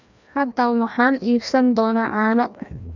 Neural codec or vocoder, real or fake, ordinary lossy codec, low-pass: codec, 16 kHz, 1 kbps, FreqCodec, larger model; fake; none; 7.2 kHz